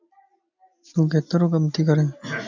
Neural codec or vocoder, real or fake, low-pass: none; real; 7.2 kHz